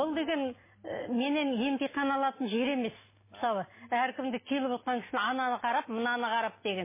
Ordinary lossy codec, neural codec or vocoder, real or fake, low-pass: MP3, 16 kbps; none; real; 3.6 kHz